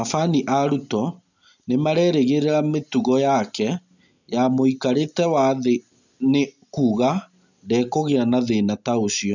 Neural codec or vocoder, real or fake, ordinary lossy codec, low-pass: none; real; none; 7.2 kHz